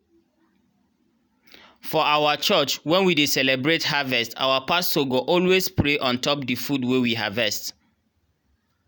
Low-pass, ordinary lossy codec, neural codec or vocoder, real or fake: none; none; none; real